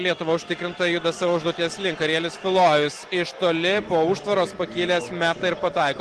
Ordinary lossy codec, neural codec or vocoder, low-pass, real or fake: Opus, 16 kbps; none; 10.8 kHz; real